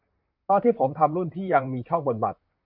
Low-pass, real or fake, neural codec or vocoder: 5.4 kHz; fake; vocoder, 44.1 kHz, 128 mel bands, Pupu-Vocoder